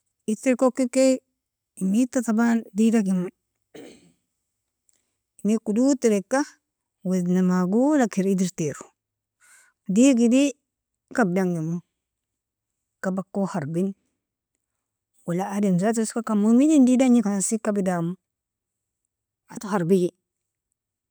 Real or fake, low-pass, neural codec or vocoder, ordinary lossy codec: real; none; none; none